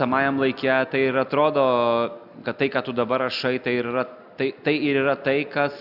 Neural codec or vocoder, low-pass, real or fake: none; 5.4 kHz; real